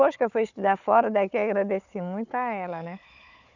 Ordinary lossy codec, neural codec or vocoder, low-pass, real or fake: none; codec, 16 kHz, 4 kbps, FunCodec, trained on Chinese and English, 50 frames a second; 7.2 kHz; fake